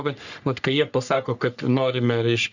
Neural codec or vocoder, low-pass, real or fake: codec, 44.1 kHz, 3.4 kbps, Pupu-Codec; 7.2 kHz; fake